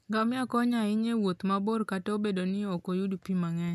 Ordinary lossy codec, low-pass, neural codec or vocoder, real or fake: none; 14.4 kHz; none; real